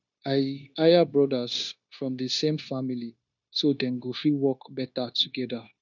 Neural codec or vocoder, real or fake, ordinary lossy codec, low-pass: codec, 16 kHz, 0.9 kbps, LongCat-Audio-Codec; fake; none; 7.2 kHz